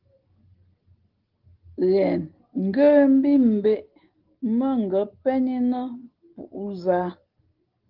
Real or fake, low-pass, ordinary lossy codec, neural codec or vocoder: real; 5.4 kHz; Opus, 16 kbps; none